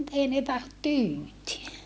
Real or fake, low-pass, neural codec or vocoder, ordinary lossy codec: real; none; none; none